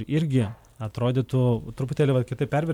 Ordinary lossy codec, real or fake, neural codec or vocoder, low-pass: MP3, 96 kbps; real; none; 19.8 kHz